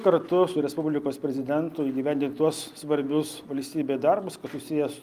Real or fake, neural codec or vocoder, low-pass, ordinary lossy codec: real; none; 14.4 kHz; Opus, 32 kbps